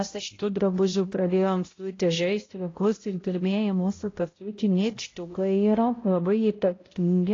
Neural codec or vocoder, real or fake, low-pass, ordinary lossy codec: codec, 16 kHz, 0.5 kbps, X-Codec, HuBERT features, trained on balanced general audio; fake; 7.2 kHz; AAC, 32 kbps